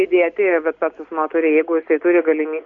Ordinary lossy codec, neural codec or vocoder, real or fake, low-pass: AAC, 48 kbps; none; real; 7.2 kHz